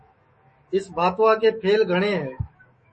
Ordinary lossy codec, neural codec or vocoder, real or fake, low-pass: MP3, 32 kbps; autoencoder, 48 kHz, 128 numbers a frame, DAC-VAE, trained on Japanese speech; fake; 10.8 kHz